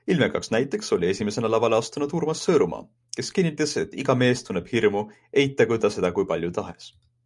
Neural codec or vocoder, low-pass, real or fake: none; 10.8 kHz; real